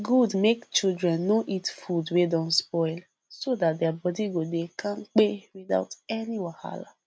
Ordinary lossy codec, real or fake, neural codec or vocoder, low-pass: none; real; none; none